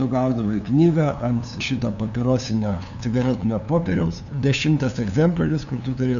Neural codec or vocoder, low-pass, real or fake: codec, 16 kHz, 2 kbps, FunCodec, trained on LibriTTS, 25 frames a second; 7.2 kHz; fake